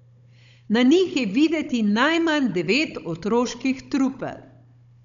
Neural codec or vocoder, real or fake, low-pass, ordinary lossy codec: codec, 16 kHz, 16 kbps, FunCodec, trained on Chinese and English, 50 frames a second; fake; 7.2 kHz; none